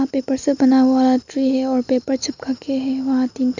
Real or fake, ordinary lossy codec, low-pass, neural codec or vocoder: real; none; 7.2 kHz; none